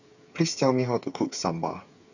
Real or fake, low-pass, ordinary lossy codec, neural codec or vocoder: fake; 7.2 kHz; none; codec, 16 kHz, 8 kbps, FreqCodec, smaller model